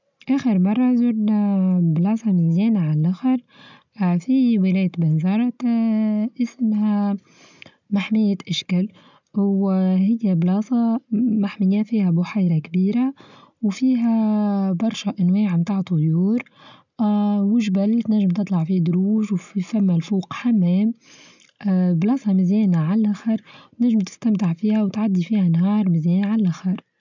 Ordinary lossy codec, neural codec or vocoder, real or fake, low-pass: none; none; real; 7.2 kHz